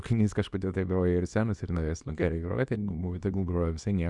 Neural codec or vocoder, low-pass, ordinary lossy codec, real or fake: codec, 24 kHz, 0.9 kbps, WavTokenizer, small release; 10.8 kHz; Opus, 64 kbps; fake